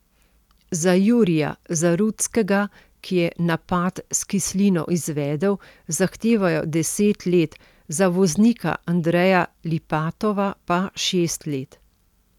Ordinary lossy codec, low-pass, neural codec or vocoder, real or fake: none; 19.8 kHz; none; real